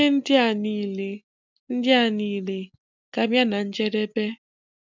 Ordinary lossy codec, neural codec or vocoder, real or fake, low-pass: none; none; real; 7.2 kHz